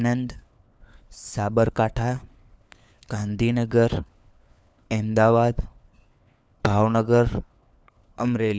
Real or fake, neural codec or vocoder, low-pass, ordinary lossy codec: fake; codec, 16 kHz, 4 kbps, FunCodec, trained on LibriTTS, 50 frames a second; none; none